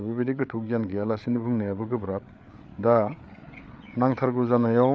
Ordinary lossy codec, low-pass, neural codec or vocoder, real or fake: none; none; codec, 16 kHz, 8 kbps, FreqCodec, larger model; fake